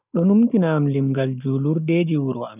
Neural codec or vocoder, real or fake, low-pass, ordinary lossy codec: none; real; 3.6 kHz; none